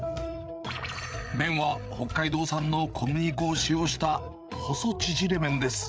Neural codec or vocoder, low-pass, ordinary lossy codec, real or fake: codec, 16 kHz, 8 kbps, FreqCodec, larger model; none; none; fake